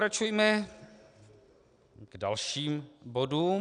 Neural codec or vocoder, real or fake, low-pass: none; real; 9.9 kHz